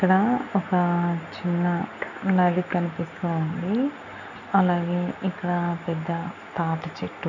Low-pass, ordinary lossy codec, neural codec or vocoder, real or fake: 7.2 kHz; none; none; real